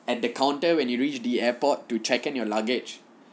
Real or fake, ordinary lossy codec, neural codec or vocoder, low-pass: real; none; none; none